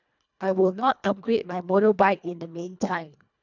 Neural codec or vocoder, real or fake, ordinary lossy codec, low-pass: codec, 24 kHz, 1.5 kbps, HILCodec; fake; none; 7.2 kHz